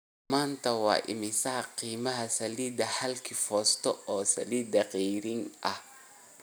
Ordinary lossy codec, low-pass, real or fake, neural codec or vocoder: none; none; real; none